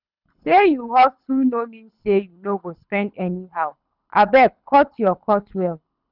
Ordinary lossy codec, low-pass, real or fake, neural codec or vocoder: none; 5.4 kHz; fake; codec, 24 kHz, 6 kbps, HILCodec